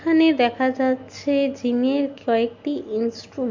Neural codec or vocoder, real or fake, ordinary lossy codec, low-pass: none; real; MP3, 48 kbps; 7.2 kHz